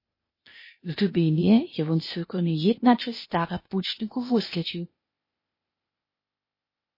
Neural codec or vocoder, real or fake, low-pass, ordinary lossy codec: codec, 16 kHz, 0.8 kbps, ZipCodec; fake; 5.4 kHz; MP3, 24 kbps